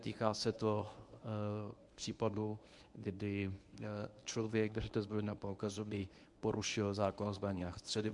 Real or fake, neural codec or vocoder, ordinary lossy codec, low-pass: fake; codec, 24 kHz, 0.9 kbps, WavTokenizer, medium speech release version 1; MP3, 64 kbps; 10.8 kHz